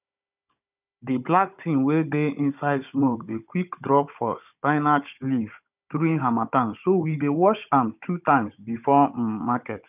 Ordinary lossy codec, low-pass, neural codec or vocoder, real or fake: none; 3.6 kHz; codec, 16 kHz, 16 kbps, FunCodec, trained on Chinese and English, 50 frames a second; fake